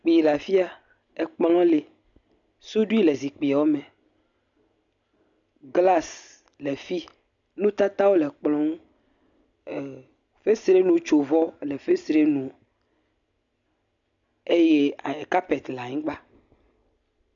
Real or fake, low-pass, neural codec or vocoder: real; 7.2 kHz; none